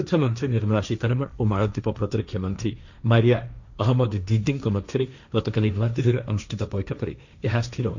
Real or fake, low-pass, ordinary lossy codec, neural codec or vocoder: fake; 7.2 kHz; none; codec, 16 kHz, 1.1 kbps, Voila-Tokenizer